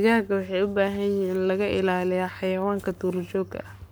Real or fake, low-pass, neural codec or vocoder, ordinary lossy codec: fake; none; codec, 44.1 kHz, 7.8 kbps, Pupu-Codec; none